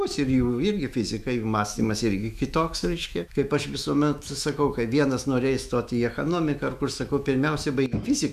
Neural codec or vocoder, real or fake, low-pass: autoencoder, 48 kHz, 128 numbers a frame, DAC-VAE, trained on Japanese speech; fake; 14.4 kHz